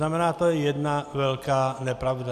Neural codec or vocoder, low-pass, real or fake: none; 14.4 kHz; real